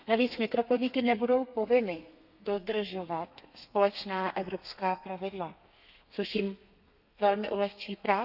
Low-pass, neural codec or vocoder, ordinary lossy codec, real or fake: 5.4 kHz; codec, 32 kHz, 1.9 kbps, SNAC; none; fake